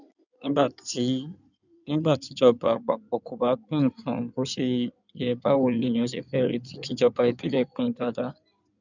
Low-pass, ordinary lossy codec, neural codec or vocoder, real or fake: 7.2 kHz; none; codec, 16 kHz in and 24 kHz out, 2.2 kbps, FireRedTTS-2 codec; fake